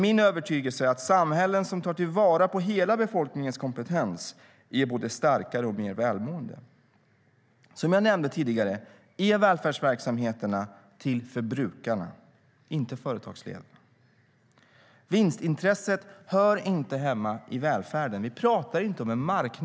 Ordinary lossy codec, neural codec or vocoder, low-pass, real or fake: none; none; none; real